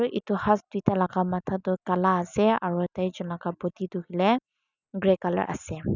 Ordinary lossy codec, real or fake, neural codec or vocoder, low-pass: none; real; none; 7.2 kHz